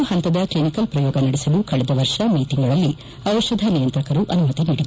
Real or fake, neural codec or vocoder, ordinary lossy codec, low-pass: real; none; none; none